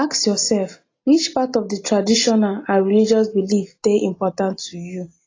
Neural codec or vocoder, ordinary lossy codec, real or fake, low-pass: none; AAC, 32 kbps; real; 7.2 kHz